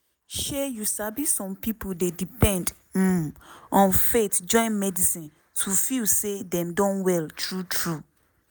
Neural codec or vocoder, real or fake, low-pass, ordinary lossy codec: none; real; none; none